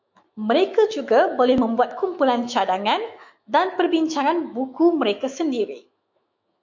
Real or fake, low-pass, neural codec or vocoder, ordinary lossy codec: fake; 7.2 kHz; autoencoder, 48 kHz, 128 numbers a frame, DAC-VAE, trained on Japanese speech; MP3, 48 kbps